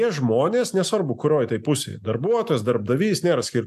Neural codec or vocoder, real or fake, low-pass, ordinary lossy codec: none; real; 14.4 kHz; AAC, 96 kbps